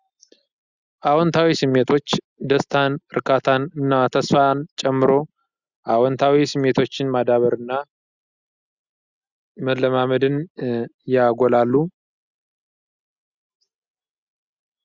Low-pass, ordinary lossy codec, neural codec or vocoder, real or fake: 7.2 kHz; Opus, 64 kbps; none; real